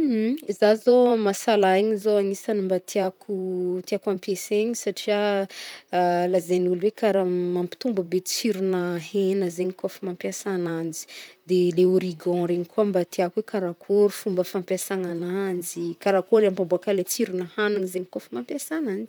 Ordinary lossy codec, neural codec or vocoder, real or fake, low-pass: none; vocoder, 44.1 kHz, 128 mel bands, Pupu-Vocoder; fake; none